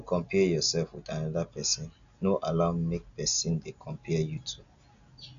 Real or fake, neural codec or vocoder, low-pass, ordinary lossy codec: real; none; 7.2 kHz; AAC, 96 kbps